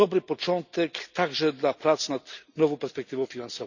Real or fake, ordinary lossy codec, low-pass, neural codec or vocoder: real; none; 7.2 kHz; none